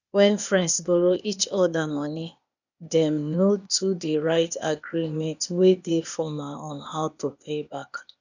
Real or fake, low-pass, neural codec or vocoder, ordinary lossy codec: fake; 7.2 kHz; codec, 16 kHz, 0.8 kbps, ZipCodec; none